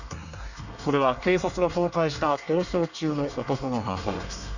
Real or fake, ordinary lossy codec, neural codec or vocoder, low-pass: fake; none; codec, 24 kHz, 1 kbps, SNAC; 7.2 kHz